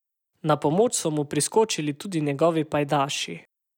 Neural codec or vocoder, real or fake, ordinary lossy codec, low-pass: none; real; none; 19.8 kHz